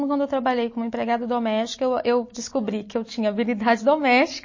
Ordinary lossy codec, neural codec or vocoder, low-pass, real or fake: MP3, 32 kbps; none; 7.2 kHz; real